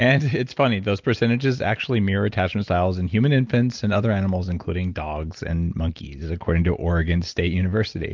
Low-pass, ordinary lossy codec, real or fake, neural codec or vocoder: 7.2 kHz; Opus, 32 kbps; real; none